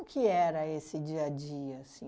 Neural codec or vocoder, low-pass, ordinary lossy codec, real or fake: none; none; none; real